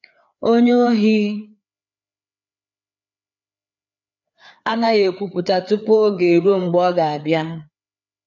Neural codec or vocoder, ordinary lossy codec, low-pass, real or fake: codec, 16 kHz, 4 kbps, FreqCodec, larger model; none; 7.2 kHz; fake